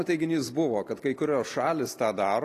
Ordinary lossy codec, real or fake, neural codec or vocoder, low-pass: AAC, 48 kbps; real; none; 14.4 kHz